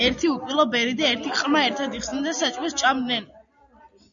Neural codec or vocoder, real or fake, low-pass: none; real; 7.2 kHz